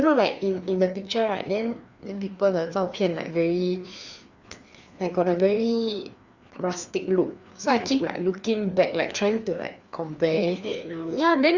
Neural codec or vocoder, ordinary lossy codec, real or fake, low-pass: codec, 16 kHz, 2 kbps, FreqCodec, larger model; Opus, 64 kbps; fake; 7.2 kHz